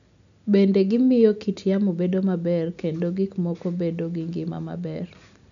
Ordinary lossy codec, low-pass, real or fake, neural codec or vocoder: none; 7.2 kHz; real; none